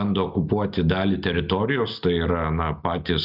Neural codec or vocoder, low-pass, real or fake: none; 5.4 kHz; real